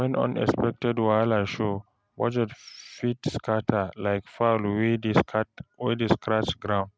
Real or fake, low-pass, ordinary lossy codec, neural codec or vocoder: real; none; none; none